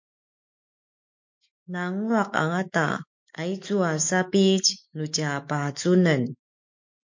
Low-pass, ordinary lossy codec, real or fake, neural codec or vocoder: 7.2 kHz; MP3, 48 kbps; fake; autoencoder, 48 kHz, 128 numbers a frame, DAC-VAE, trained on Japanese speech